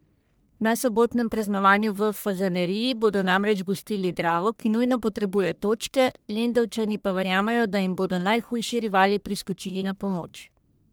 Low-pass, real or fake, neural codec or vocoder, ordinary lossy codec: none; fake; codec, 44.1 kHz, 1.7 kbps, Pupu-Codec; none